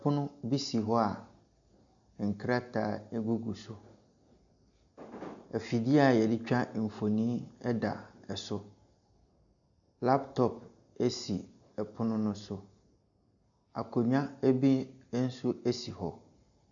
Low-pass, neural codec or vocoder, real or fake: 7.2 kHz; none; real